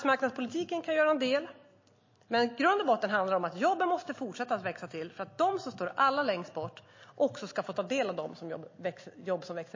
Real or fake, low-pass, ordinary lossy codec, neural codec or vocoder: real; 7.2 kHz; MP3, 32 kbps; none